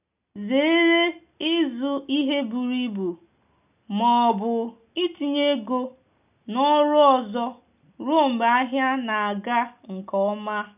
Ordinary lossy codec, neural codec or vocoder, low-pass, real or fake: none; none; 3.6 kHz; real